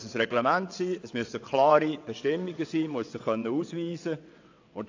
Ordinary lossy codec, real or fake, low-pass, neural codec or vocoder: AAC, 48 kbps; fake; 7.2 kHz; vocoder, 22.05 kHz, 80 mel bands, WaveNeXt